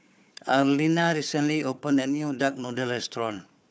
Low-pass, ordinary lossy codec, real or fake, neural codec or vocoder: none; none; fake; codec, 16 kHz, 4 kbps, FunCodec, trained on Chinese and English, 50 frames a second